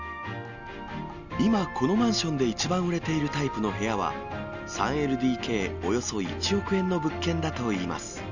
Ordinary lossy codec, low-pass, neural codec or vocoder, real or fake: none; 7.2 kHz; none; real